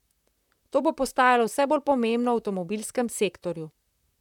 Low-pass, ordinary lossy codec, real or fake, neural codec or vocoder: 19.8 kHz; none; fake; vocoder, 44.1 kHz, 128 mel bands, Pupu-Vocoder